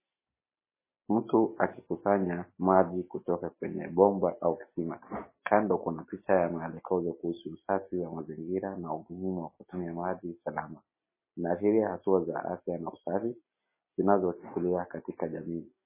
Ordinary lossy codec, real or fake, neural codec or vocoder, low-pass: MP3, 16 kbps; real; none; 3.6 kHz